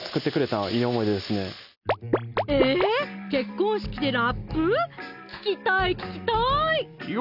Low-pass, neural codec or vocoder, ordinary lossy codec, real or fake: 5.4 kHz; none; none; real